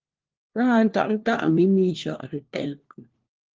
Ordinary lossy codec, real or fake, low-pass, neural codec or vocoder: Opus, 16 kbps; fake; 7.2 kHz; codec, 16 kHz, 1 kbps, FunCodec, trained on LibriTTS, 50 frames a second